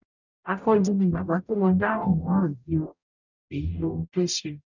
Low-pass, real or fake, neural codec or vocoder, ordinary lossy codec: 7.2 kHz; fake; codec, 44.1 kHz, 0.9 kbps, DAC; none